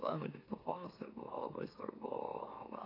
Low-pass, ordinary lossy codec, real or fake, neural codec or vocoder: 5.4 kHz; AAC, 24 kbps; fake; autoencoder, 44.1 kHz, a latent of 192 numbers a frame, MeloTTS